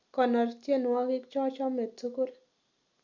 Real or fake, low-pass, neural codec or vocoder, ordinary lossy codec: real; 7.2 kHz; none; none